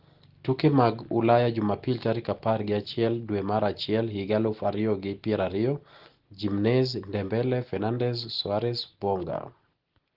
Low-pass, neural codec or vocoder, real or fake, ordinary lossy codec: 5.4 kHz; none; real; Opus, 16 kbps